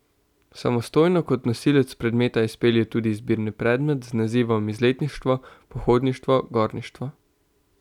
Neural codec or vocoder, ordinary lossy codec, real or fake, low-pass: none; none; real; 19.8 kHz